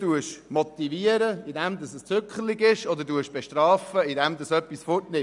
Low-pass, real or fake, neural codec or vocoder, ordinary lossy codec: 10.8 kHz; real; none; none